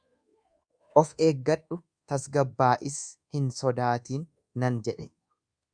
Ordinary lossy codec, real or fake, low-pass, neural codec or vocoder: Opus, 64 kbps; fake; 9.9 kHz; codec, 24 kHz, 1.2 kbps, DualCodec